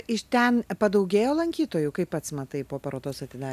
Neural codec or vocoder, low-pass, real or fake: none; 14.4 kHz; real